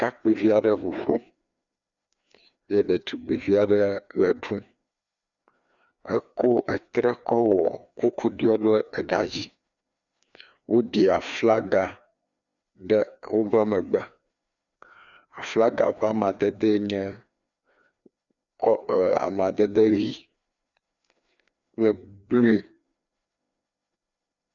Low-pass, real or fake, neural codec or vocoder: 7.2 kHz; fake; codec, 16 kHz, 2 kbps, FreqCodec, larger model